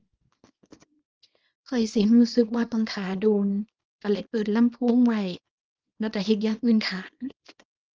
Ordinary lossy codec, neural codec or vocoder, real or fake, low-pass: Opus, 24 kbps; codec, 24 kHz, 0.9 kbps, WavTokenizer, small release; fake; 7.2 kHz